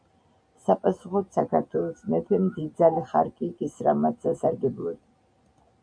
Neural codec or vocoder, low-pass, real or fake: none; 9.9 kHz; real